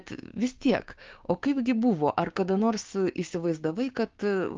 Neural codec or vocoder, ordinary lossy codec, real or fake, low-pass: codec, 16 kHz, 6 kbps, DAC; Opus, 32 kbps; fake; 7.2 kHz